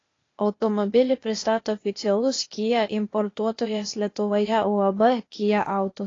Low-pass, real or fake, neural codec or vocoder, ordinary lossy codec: 7.2 kHz; fake; codec, 16 kHz, 0.8 kbps, ZipCodec; AAC, 32 kbps